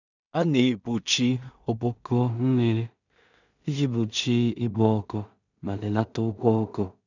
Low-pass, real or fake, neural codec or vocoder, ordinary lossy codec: 7.2 kHz; fake; codec, 16 kHz in and 24 kHz out, 0.4 kbps, LongCat-Audio-Codec, two codebook decoder; none